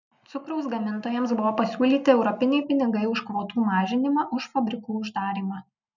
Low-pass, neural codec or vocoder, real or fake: 7.2 kHz; none; real